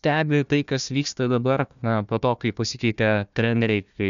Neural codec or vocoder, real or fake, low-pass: codec, 16 kHz, 1 kbps, FunCodec, trained on Chinese and English, 50 frames a second; fake; 7.2 kHz